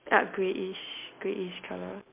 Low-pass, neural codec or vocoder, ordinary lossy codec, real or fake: 3.6 kHz; none; MP3, 32 kbps; real